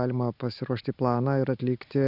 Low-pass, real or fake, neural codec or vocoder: 5.4 kHz; real; none